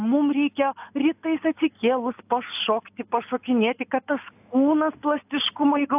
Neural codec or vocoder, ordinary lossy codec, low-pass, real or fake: none; AAC, 32 kbps; 3.6 kHz; real